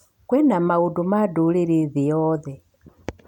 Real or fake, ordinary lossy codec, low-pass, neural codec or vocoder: real; none; 19.8 kHz; none